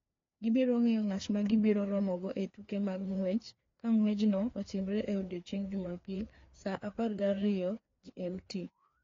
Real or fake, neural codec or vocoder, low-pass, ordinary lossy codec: fake; codec, 16 kHz, 2 kbps, FreqCodec, larger model; 7.2 kHz; AAC, 32 kbps